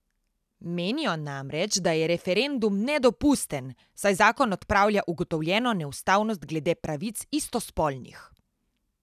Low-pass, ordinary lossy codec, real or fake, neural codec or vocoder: 14.4 kHz; none; real; none